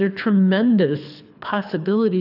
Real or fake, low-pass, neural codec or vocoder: fake; 5.4 kHz; codec, 24 kHz, 6 kbps, HILCodec